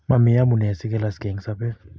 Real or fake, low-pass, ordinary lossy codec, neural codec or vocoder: real; none; none; none